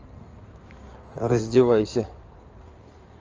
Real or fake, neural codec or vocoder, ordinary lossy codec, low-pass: fake; codec, 16 kHz in and 24 kHz out, 2.2 kbps, FireRedTTS-2 codec; Opus, 24 kbps; 7.2 kHz